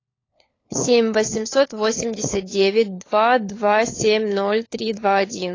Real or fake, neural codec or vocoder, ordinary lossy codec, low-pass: fake; codec, 16 kHz, 4 kbps, FunCodec, trained on LibriTTS, 50 frames a second; AAC, 32 kbps; 7.2 kHz